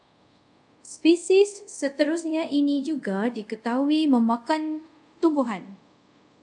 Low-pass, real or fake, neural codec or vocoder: 10.8 kHz; fake; codec, 24 kHz, 0.5 kbps, DualCodec